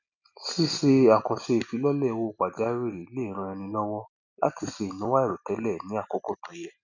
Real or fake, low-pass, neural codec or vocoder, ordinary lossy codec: real; 7.2 kHz; none; none